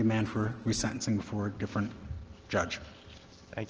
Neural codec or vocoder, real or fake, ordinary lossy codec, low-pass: none; real; Opus, 16 kbps; 7.2 kHz